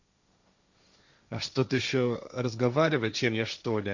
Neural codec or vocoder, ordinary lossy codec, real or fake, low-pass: codec, 16 kHz, 1.1 kbps, Voila-Tokenizer; Opus, 64 kbps; fake; 7.2 kHz